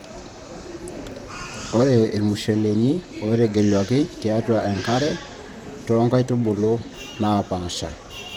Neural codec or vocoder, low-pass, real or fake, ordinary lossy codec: vocoder, 44.1 kHz, 128 mel bands, Pupu-Vocoder; 19.8 kHz; fake; none